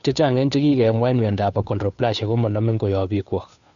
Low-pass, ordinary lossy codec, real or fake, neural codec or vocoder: 7.2 kHz; none; fake; codec, 16 kHz, 2 kbps, FunCodec, trained on Chinese and English, 25 frames a second